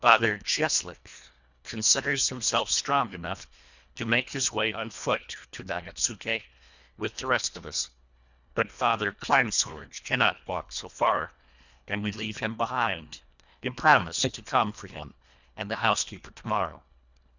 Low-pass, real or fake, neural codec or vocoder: 7.2 kHz; fake; codec, 24 kHz, 1.5 kbps, HILCodec